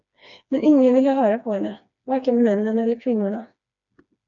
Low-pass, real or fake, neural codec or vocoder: 7.2 kHz; fake; codec, 16 kHz, 2 kbps, FreqCodec, smaller model